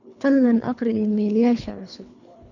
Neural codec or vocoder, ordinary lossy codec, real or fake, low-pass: codec, 24 kHz, 3 kbps, HILCodec; none; fake; 7.2 kHz